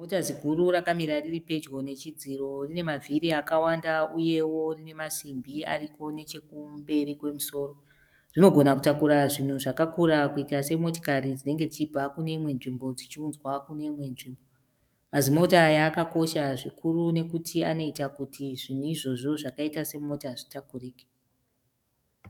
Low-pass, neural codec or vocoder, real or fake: 19.8 kHz; codec, 44.1 kHz, 7.8 kbps, DAC; fake